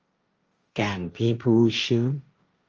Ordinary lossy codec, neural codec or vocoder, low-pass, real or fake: Opus, 24 kbps; codec, 16 kHz, 1.1 kbps, Voila-Tokenizer; 7.2 kHz; fake